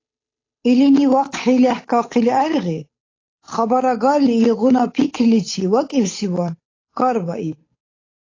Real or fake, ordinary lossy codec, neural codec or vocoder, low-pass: fake; AAC, 32 kbps; codec, 16 kHz, 8 kbps, FunCodec, trained on Chinese and English, 25 frames a second; 7.2 kHz